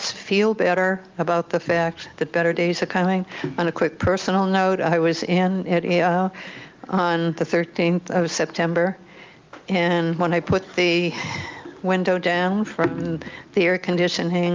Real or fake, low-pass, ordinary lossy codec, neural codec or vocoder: real; 7.2 kHz; Opus, 24 kbps; none